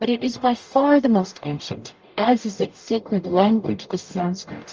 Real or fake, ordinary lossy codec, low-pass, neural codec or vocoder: fake; Opus, 24 kbps; 7.2 kHz; codec, 44.1 kHz, 0.9 kbps, DAC